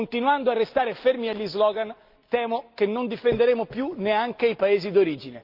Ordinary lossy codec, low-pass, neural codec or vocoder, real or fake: Opus, 32 kbps; 5.4 kHz; none; real